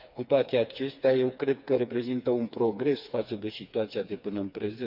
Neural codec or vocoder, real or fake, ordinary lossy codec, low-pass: codec, 16 kHz, 4 kbps, FreqCodec, smaller model; fake; none; 5.4 kHz